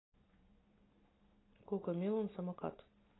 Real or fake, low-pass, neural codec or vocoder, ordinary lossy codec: real; 7.2 kHz; none; AAC, 16 kbps